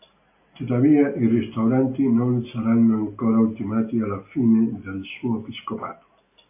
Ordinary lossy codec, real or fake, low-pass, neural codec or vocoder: AAC, 24 kbps; real; 3.6 kHz; none